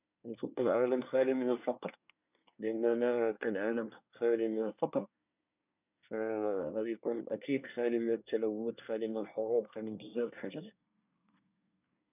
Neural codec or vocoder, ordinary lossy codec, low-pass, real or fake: codec, 24 kHz, 1 kbps, SNAC; none; 3.6 kHz; fake